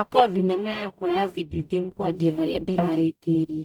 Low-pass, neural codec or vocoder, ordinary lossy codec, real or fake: 19.8 kHz; codec, 44.1 kHz, 0.9 kbps, DAC; none; fake